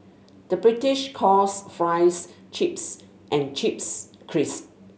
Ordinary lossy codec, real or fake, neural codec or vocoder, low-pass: none; real; none; none